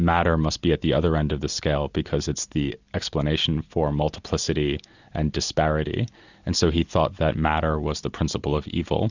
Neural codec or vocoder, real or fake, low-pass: none; real; 7.2 kHz